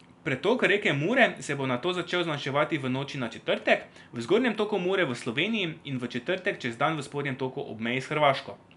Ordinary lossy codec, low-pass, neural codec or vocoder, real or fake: none; 10.8 kHz; none; real